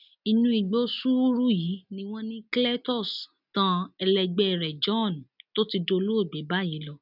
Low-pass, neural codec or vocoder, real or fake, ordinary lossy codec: 5.4 kHz; none; real; none